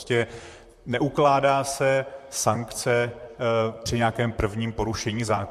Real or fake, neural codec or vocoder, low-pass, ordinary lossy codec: fake; vocoder, 44.1 kHz, 128 mel bands, Pupu-Vocoder; 14.4 kHz; MP3, 64 kbps